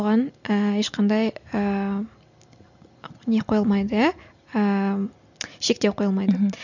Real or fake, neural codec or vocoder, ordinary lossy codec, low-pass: real; none; none; 7.2 kHz